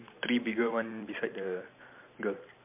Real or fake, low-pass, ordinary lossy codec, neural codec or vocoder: fake; 3.6 kHz; MP3, 32 kbps; vocoder, 44.1 kHz, 128 mel bands every 512 samples, BigVGAN v2